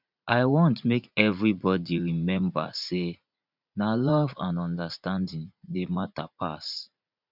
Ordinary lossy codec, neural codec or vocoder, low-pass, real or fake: none; vocoder, 44.1 kHz, 80 mel bands, Vocos; 5.4 kHz; fake